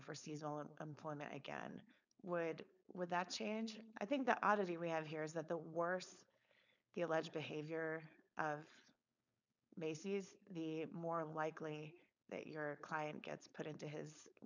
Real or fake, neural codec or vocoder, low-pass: fake; codec, 16 kHz, 4.8 kbps, FACodec; 7.2 kHz